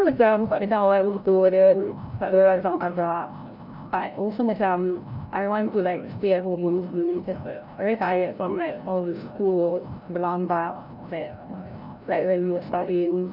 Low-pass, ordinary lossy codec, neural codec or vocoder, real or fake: 5.4 kHz; none; codec, 16 kHz, 0.5 kbps, FreqCodec, larger model; fake